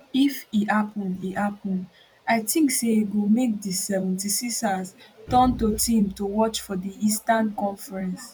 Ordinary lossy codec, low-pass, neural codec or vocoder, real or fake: none; 19.8 kHz; vocoder, 44.1 kHz, 128 mel bands every 512 samples, BigVGAN v2; fake